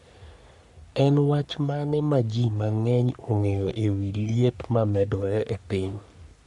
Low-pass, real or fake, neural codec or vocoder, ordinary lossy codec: 10.8 kHz; fake; codec, 44.1 kHz, 3.4 kbps, Pupu-Codec; none